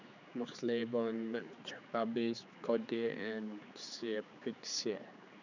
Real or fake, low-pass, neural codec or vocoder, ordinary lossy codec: fake; 7.2 kHz; codec, 16 kHz, 4 kbps, X-Codec, HuBERT features, trained on general audio; none